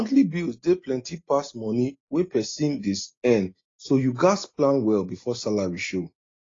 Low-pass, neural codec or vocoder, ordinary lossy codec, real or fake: 7.2 kHz; none; AAC, 32 kbps; real